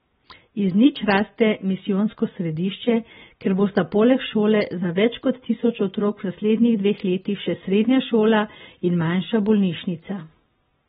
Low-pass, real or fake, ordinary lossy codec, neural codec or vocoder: 19.8 kHz; real; AAC, 16 kbps; none